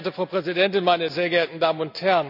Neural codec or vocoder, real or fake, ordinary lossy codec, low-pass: none; real; none; 5.4 kHz